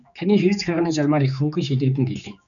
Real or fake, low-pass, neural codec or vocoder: fake; 7.2 kHz; codec, 16 kHz, 4 kbps, X-Codec, HuBERT features, trained on general audio